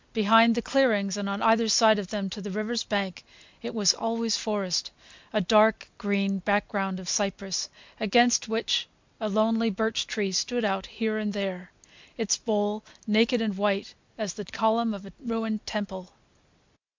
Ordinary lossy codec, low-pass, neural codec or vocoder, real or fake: MP3, 64 kbps; 7.2 kHz; none; real